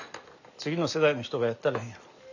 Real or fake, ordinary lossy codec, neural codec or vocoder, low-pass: real; none; none; 7.2 kHz